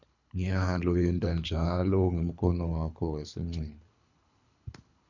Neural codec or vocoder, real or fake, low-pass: codec, 24 kHz, 3 kbps, HILCodec; fake; 7.2 kHz